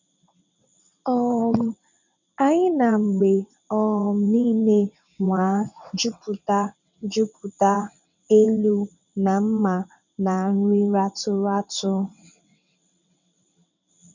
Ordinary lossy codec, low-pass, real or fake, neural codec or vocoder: none; 7.2 kHz; fake; vocoder, 22.05 kHz, 80 mel bands, WaveNeXt